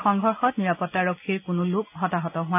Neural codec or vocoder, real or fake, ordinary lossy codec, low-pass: none; real; none; 3.6 kHz